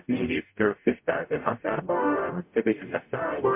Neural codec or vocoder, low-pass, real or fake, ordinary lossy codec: codec, 44.1 kHz, 0.9 kbps, DAC; 3.6 kHz; fake; MP3, 32 kbps